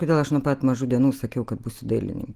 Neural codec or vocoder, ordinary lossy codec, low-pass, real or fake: none; Opus, 24 kbps; 14.4 kHz; real